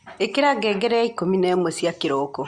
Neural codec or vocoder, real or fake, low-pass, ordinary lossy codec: none; real; 9.9 kHz; Opus, 64 kbps